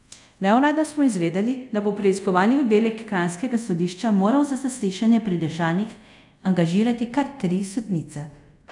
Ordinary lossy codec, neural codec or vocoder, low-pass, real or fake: none; codec, 24 kHz, 0.5 kbps, DualCodec; 10.8 kHz; fake